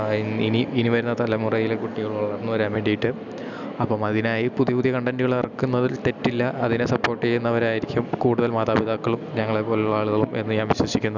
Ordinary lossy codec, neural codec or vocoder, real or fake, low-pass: none; none; real; 7.2 kHz